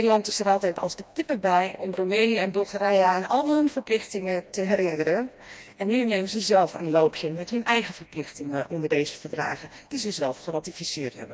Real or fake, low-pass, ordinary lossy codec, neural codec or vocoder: fake; none; none; codec, 16 kHz, 1 kbps, FreqCodec, smaller model